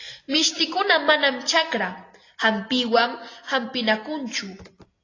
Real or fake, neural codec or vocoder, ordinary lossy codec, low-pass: real; none; AAC, 32 kbps; 7.2 kHz